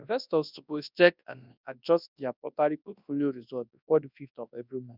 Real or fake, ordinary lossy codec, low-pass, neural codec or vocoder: fake; none; 5.4 kHz; codec, 24 kHz, 0.9 kbps, WavTokenizer, large speech release